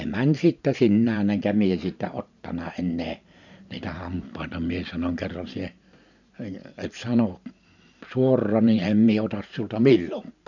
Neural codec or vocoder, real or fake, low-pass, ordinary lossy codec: vocoder, 44.1 kHz, 128 mel bands every 512 samples, BigVGAN v2; fake; 7.2 kHz; none